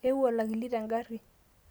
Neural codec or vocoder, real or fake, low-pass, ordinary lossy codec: none; real; none; none